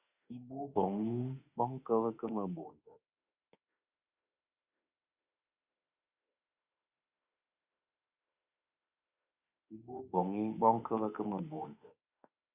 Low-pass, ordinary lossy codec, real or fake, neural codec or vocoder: 3.6 kHz; Opus, 64 kbps; fake; autoencoder, 48 kHz, 32 numbers a frame, DAC-VAE, trained on Japanese speech